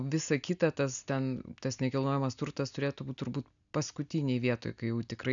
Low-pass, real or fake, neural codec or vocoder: 7.2 kHz; real; none